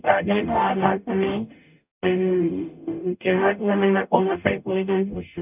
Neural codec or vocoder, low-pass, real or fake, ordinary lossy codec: codec, 44.1 kHz, 0.9 kbps, DAC; 3.6 kHz; fake; none